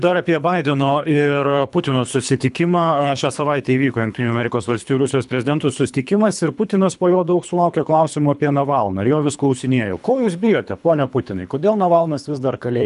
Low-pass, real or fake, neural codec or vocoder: 10.8 kHz; fake; codec, 24 kHz, 3 kbps, HILCodec